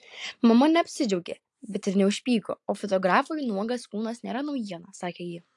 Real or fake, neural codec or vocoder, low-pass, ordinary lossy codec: real; none; 10.8 kHz; AAC, 64 kbps